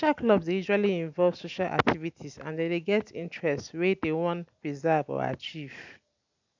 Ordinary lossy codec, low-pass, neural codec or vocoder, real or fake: none; 7.2 kHz; none; real